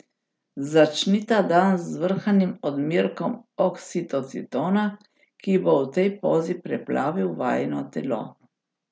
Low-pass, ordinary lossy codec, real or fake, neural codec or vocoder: none; none; real; none